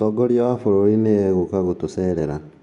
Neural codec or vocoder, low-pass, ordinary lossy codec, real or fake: vocoder, 24 kHz, 100 mel bands, Vocos; 10.8 kHz; none; fake